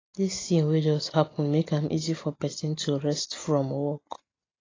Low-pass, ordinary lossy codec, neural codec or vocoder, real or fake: 7.2 kHz; AAC, 32 kbps; none; real